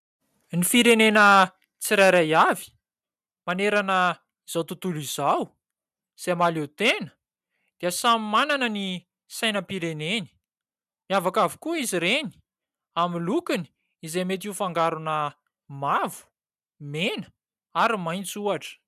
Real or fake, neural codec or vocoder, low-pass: real; none; 14.4 kHz